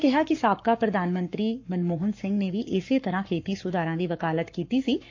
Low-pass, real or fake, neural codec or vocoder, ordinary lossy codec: 7.2 kHz; fake; codec, 44.1 kHz, 7.8 kbps, Pupu-Codec; AAC, 48 kbps